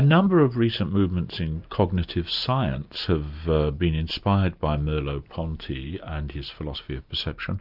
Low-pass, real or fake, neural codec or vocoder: 5.4 kHz; real; none